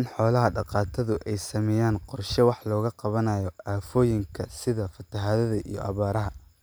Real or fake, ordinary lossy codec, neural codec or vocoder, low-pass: real; none; none; none